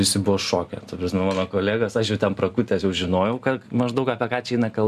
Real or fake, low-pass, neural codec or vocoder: real; 14.4 kHz; none